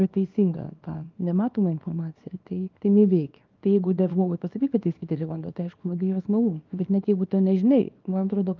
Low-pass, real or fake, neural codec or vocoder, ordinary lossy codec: 7.2 kHz; fake; codec, 24 kHz, 0.9 kbps, WavTokenizer, small release; Opus, 32 kbps